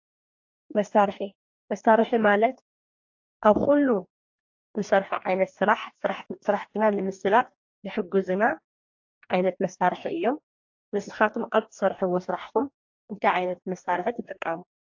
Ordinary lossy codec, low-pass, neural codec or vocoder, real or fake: AAC, 48 kbps; 7.2 kHz; codec, 44.1 kHz, 2.6 kbps, DAC; fake